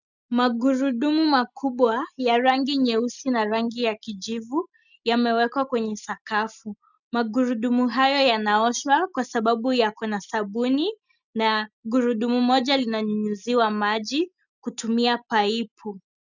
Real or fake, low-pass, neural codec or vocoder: real; 7.2 kHz; none